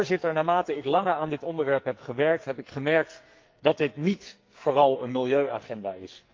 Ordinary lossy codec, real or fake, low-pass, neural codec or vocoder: Opus, 24 kbps; fake; 7.2 kHz; codec, 44.1 kHz, 2.6 kbps, SNAC